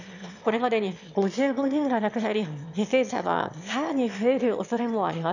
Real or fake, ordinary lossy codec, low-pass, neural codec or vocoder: fake; none; 7.2 kHz; autoencoder, 22.05 kHz, a latent of 192 numbers a frame, VITS, trained on one speaker